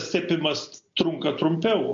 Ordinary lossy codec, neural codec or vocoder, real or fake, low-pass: MP3, 48 kbps; none; real; 7.2 kHz